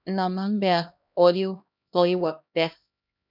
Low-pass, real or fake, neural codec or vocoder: 5.4 kHz; fake; codec, 16 kHz, 1 kbps, X-Codec, HuBERT features, trained on LibriSpeech